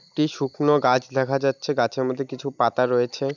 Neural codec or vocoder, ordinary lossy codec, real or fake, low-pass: none; none; real; 7.2 kHz